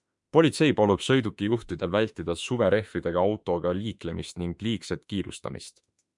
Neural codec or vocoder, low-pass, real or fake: autoencoder, 48 kHz, 32 numbers a frame, DAC-VAE, trained on Japanese speech; 10.8 kHz; fake